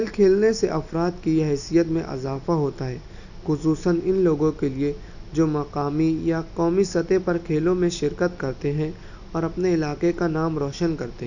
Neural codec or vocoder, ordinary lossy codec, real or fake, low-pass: none; none; real; 7.2 kHz